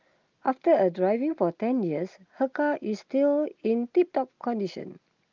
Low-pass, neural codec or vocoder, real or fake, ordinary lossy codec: 7.2 kHz; none; real; Opus, 24 kbps